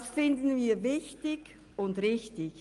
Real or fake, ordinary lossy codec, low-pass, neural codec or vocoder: real; Opus, 32 kbps; 10.8 kHz; none